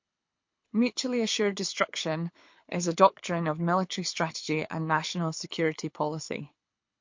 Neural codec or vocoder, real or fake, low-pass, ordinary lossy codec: codec, 24 kHz, 6 kbps, HILCodec; fake; 7.2 kHz; MP3, 48 kbps